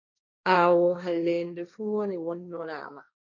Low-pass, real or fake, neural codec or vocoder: 7.2 kHz; fake; codec, 16 kHz, 1.1 kbps, Voila-Tokenizer